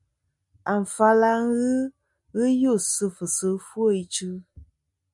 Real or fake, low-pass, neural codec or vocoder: real; 10.8 kHz; none